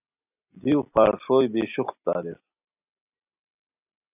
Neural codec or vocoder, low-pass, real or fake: none; 3.6 kHz; real